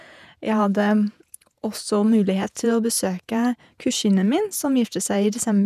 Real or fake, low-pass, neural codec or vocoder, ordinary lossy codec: fake; 14.4 kHz; vocoder, 48 kHz, 128 mel bands, Vocos; none